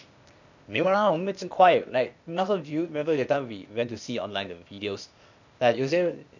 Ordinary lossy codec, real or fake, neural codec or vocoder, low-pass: none; fake; codec, 16 kHz, 0.8 kbps, ZipCodec; 7.2 kHz